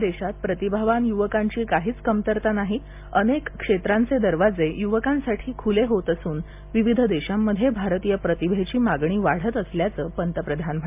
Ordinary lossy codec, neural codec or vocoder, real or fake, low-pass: MP3, 32 kbps; none; real; 3.6 kHz